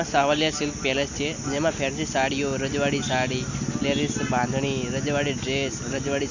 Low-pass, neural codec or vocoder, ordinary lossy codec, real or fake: 7.2 kHz; none; none; real